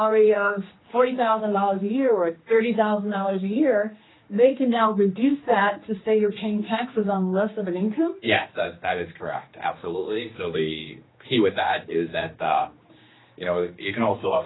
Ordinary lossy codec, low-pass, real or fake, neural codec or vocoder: AAC, 16 kbps; 7.2 kHz; fake; codec, 16 kHz, 2 kbps, X-Codec, HuBERT features, trained on general audio